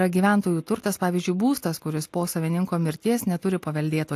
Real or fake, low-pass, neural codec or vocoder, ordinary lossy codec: real; 14.4 kHz; none; AAC, 64 kbps